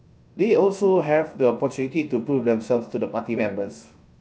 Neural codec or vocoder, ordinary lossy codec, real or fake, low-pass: codec, 16 kHz, 0.7 kbps, FocalCodec; none; fake; none